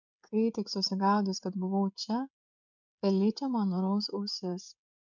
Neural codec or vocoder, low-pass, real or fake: codec, 16 kHz, 16 kbps, FreqCodec, smaller model; 7.2 kHz; fake